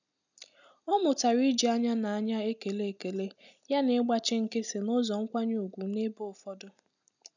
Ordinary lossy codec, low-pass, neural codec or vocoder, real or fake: none; 7.2 kHz; none; real